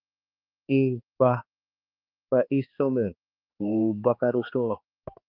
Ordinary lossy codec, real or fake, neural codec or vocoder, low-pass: Opus, 32 kbps; fake; codec, 16 kHz, 2 kbps, X-Codec, HuBERT features, trained on balanced general audio; 5.4 kHz